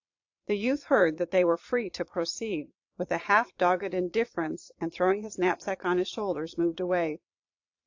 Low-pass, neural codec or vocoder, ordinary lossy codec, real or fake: 7.2 kHz; vocoder, 22.05 kHz, 80 mel bands, Vocos; AAC, 48 kbps; fake